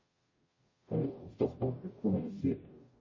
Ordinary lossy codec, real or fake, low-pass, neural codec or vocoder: MP3, 32 kbps; fake; 7.2 kHz; codec, 44.1 kHz, 0.9 kbps, DAC